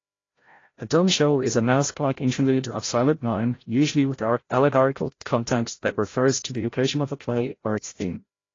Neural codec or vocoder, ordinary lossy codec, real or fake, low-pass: codec, 16 kHz, 0.5 kbps, FreqCodec, larger model; AAC, 32 kbps; fake; 7.2 kHz